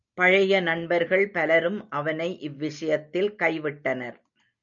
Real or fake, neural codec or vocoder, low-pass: real; none; 7.2 kHz